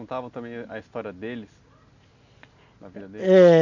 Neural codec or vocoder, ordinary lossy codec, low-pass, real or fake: none; none; 7.2 kHz; real